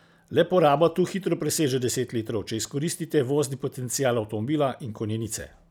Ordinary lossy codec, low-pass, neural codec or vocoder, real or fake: none; none; none; real